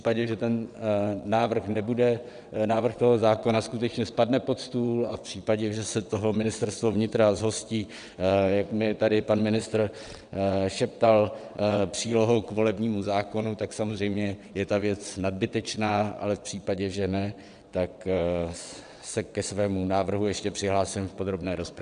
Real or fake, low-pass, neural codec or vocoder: fake; 9.9 kHz; vocoder, 22.05 kHz, 80 mel bands, WaveNeXt